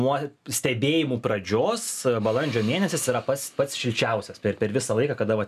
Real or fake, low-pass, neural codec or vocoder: real; 14.4 kHz; none